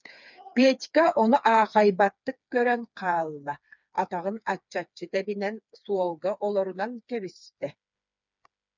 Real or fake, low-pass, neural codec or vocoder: fake; 7.2 kHz; codec, 16 kHz, 4 kbps, FreqCodec, smaller model